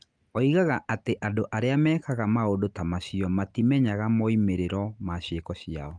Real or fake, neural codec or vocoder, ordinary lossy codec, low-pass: real; none; Opus, 32 kbps; 9.9 kHz